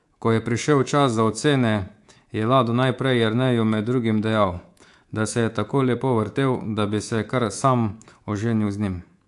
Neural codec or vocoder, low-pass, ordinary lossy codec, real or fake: codec, 24 kHz, 3.1 kbps, DualCodec; 10.8 kHz; AAC, 64 kbps; fake